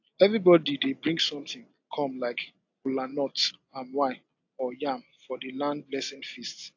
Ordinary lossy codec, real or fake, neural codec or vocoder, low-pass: AAC, 48 kbps; real; none; 7.2 kHz